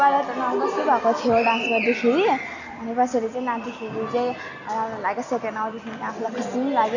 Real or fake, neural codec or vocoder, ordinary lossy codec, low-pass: real; none; none; 7.2 kHz